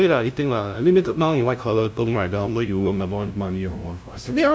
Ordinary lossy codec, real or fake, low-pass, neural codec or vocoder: none; fake; none; codec, 16 kHz, 0.5 kbps, FunCodec, trained on LibriTTS, 25 frames a second